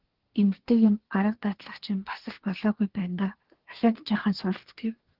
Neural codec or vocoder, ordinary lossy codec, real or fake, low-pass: codec, 24 kHz, 1 kbps, SNAC; Opus, 16 kbps; fake; 5.4 kHz